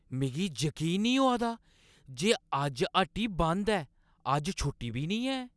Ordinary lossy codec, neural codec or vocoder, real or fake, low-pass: none; none; real; none